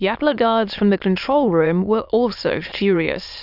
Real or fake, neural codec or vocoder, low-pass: fake; autoencoder, 22.05 kHz, a latent of 192 numbers a frame, VITS, trained on many speakers; 5.4 kHz